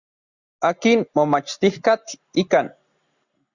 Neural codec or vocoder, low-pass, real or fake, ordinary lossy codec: none; 7.2 kHz; real; Opus, 64 kbps